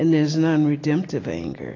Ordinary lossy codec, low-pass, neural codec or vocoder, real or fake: AAC, 32 kbps; 7.2 kHz; none; real